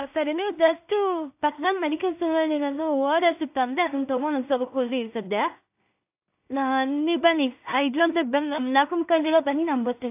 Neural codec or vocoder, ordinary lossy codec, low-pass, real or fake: codec, 16 kHz in and 24 kHz out, 0.4 kbps, LongCat-Audio-Codec, two codebook decoder; AAC, 32 kbps; 3.6 kHz; fake